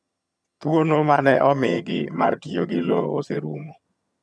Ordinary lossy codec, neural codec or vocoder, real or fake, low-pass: none; vocoder, 22.05 kHz, 80 mel bands, HiFi-GAN; fake; none